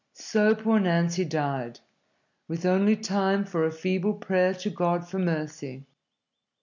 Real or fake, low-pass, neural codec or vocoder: real; 7.2 kHz; none